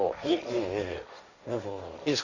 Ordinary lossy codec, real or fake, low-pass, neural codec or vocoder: none; fake; 7.2 kHz; codec, 16 kHz, 1.1 kbps, Voila-Tokenizer